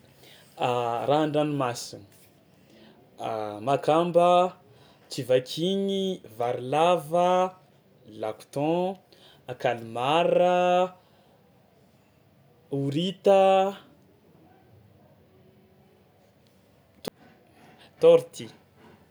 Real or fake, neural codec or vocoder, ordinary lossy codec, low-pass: real; none; none; none